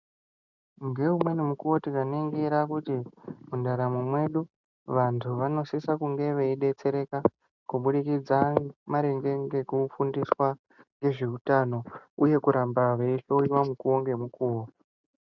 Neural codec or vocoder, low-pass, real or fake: none; 7.2 kHz; real